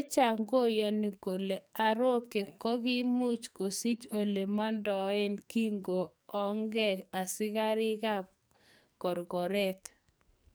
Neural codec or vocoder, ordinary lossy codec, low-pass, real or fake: codec, 44.1 kHz, 2.6 kbps, SNAC; none; none; fake